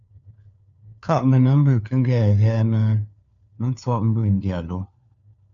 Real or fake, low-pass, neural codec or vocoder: fake; 7.2 kHz; codec, 16 kHz, 4 kbps, FunCodec, trained on LibriTTS, 50 frames a second